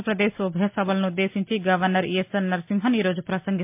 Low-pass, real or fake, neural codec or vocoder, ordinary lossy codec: 3.6 kHz; real; none; MP3, 32 kbps